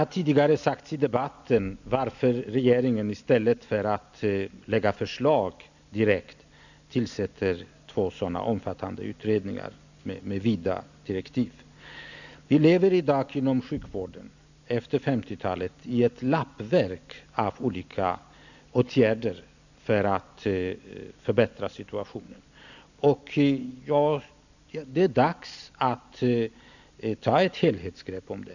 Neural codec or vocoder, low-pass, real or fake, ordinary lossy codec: none; 7.2 kHz; real; none